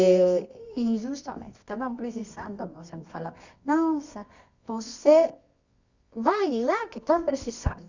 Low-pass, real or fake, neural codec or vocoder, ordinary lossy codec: 7.2 kHz; fake; codec, 24 kHz, 0.9 kbps, WavTokenizer, medium music audio release; Opus, 64 kbps